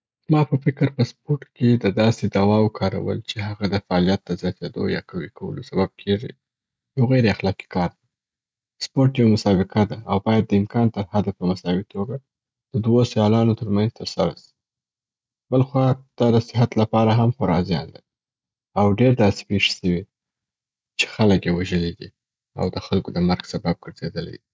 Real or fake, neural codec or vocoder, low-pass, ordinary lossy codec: real; none; none; none